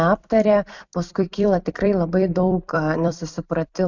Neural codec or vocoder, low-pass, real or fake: vocoder, 44.1 kHz, 128 mel bands every 256 samples, BigVGAN v2; 7.2 kHz; fake